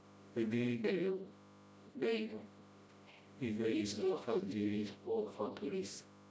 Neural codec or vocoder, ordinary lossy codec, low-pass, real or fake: codec, 16 kHz, 0.5 kbps, FreqCodec, smaller model; none; none; fake